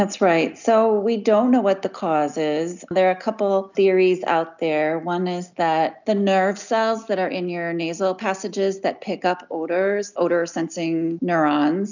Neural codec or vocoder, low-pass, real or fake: none; 7.2 kHz; real